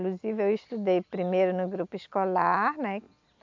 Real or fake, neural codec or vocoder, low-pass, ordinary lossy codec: real; none; 7.2 kHz; MP3, 64 kbps